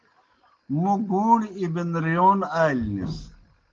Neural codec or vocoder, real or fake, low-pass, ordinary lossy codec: none; real; 7.2 kHz; Opus, 16 kbps